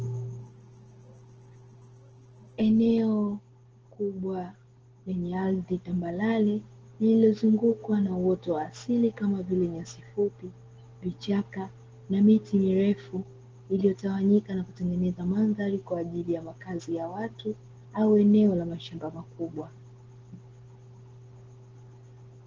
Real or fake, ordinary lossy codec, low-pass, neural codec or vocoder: real; Opus, 16 kbps; 7.2 kHz; none